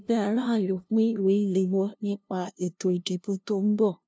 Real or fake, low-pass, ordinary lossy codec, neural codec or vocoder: fake; none; none; codec, 16 kHz, 0.5 kbps, FunCodec, trained on LibriTTS, 25 frames a second